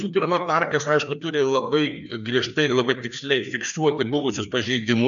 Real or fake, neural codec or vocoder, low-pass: fake; codec, 16 kHz, 2 kbps, FreqCodec, larger model; 7.2 kHz